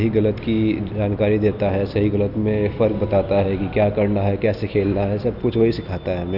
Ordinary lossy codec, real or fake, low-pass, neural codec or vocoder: none; real; 5.4 kHz; none